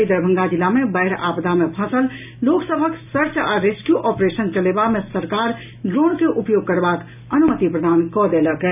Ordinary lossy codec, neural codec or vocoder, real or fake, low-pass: none; none; real; 3.6 kHz